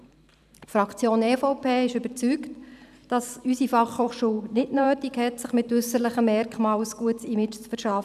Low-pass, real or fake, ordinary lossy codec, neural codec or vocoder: 14.4 kHz; fake; none; vocoder, 44.1 kHz, 128 mel bands every 512 samples, BigVGAN v2